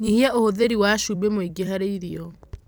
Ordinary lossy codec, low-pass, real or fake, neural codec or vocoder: none; none; fake; vocoder, 44.1 kHz, 128 mel bands every 256 samples, BigVGAN v2